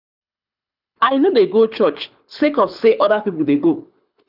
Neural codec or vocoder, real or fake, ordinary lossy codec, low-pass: codec, 24 kHz, 6 kbps, HILCodec; fake; none; 5.4 kHz